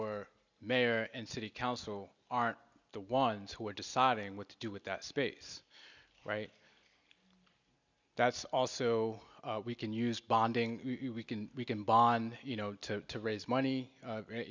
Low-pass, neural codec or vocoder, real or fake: 7.2 kHz; none; real